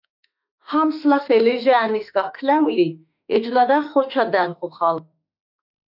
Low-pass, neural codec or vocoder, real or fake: 5.4 kHz; autoencoder, 48 kHz, 32 numbers a frame, DAC-VAE, trained on Japanese speech; fake